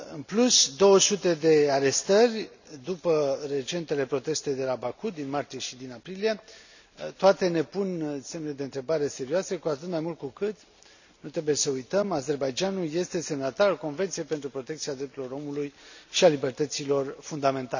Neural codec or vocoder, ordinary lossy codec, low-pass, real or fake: none; none; 7.2 kHz; real